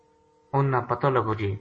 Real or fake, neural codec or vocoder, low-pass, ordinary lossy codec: real; none; 10.8 kHz; MP3, 32 kbps